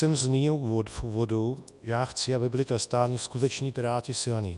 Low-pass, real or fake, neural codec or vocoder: 10.8 kHz; fake; codec, 24 kHz, 0.9 kbps, WavTokenizer, large speech release